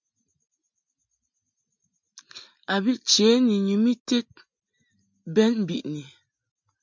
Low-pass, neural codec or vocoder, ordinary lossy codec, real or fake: 7.2 kHz; none; MP3, 64 kbps; real